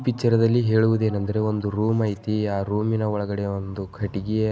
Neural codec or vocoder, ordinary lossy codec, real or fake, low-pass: none; none; real; none